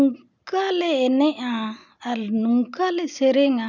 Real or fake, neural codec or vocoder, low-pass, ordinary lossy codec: real; none; 7.2 kHz; none